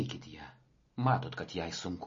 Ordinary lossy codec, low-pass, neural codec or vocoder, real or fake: MP3, 32 kbps; 7.2 kHz; none; real